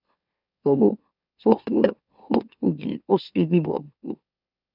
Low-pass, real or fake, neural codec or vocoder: 5.4 kHz; fake; autoencoder, 44.1 kHz, a latent of 192 numbers a frame, MeloTTS